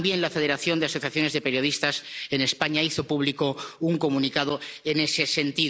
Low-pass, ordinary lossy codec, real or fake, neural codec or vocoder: none; none; real; none